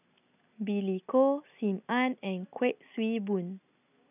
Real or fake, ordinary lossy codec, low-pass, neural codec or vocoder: real; none; 3.6 kHz; none